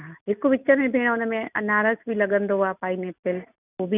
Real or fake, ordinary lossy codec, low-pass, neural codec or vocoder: real; none; 3.6 kHz; none